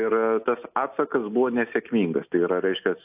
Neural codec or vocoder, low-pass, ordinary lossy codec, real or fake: none; 3.6 kHz; AAC, 32 kbps; real